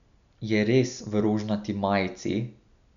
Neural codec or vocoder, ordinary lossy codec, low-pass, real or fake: none; none; 7.2 kHz; real